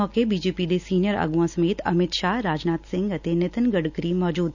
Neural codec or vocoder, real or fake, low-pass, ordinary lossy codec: none; real; 7.2 kHz; none